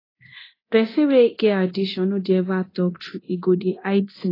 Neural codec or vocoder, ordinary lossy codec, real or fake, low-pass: codec, 24 kHz, 0.9 kbps, DualCodec; AAC, 24 kbps; fake; 5.4 kHz